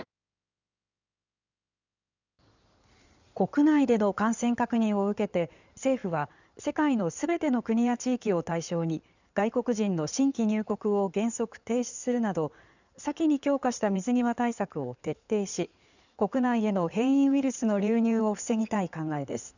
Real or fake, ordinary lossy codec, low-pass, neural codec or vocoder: fake; none; 7.2 kHz; codec, 16 kHz in and 24 kHz out, 2.2 kbps, FireRedTTS-2 codec